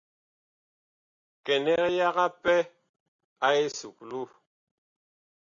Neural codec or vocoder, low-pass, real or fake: none; 7.2 kHz; real